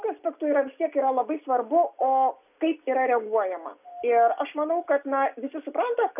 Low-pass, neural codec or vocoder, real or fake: 3.6 kHz; none; real